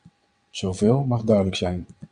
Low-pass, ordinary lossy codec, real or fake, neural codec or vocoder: 9.9 kHz; MP3, 64 kbps; fake; vocoder, 22.05 kHz, 80 mel bands, WaveNeXt